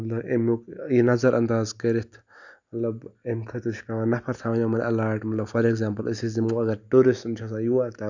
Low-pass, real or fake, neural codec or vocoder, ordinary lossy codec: 7.2 kHz; real; none; none